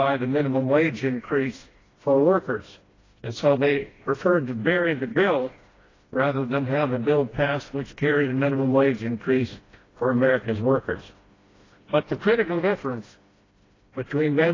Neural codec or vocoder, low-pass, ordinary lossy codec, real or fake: codec, 16 kHz, 1 kbps, FreqCodec, smaller model; 7.2 kHz; AAC, 32 kbps; fake